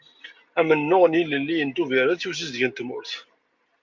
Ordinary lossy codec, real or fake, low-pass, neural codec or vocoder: MP3, 48 kbps; real; 7.2 kHz; none